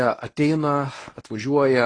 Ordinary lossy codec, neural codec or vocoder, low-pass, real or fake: AAC, 32 kbps; codec, 24 kHz, 0.9 kbps, WavTokenizer, medium speech release version 1; 9.9 kHz; fake